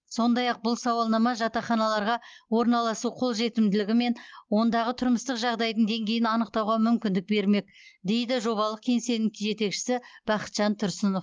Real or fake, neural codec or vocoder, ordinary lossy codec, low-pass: real; none; Opus, 32 kbps; 7.2 kHz